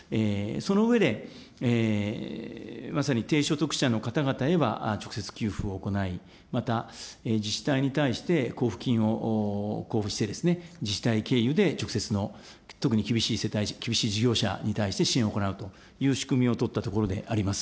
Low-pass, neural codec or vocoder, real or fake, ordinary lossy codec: none; none; real; none